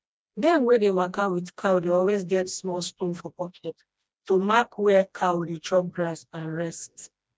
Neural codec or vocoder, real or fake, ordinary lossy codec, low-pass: codec, 16 kHz, 1 kbps, FreqCodec, smaller model; fake; none; none